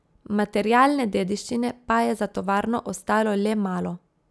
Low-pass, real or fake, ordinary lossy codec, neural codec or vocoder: none; real; none; none